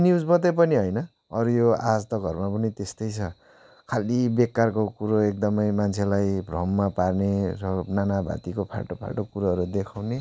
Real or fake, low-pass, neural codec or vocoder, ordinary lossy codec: real; none; none; none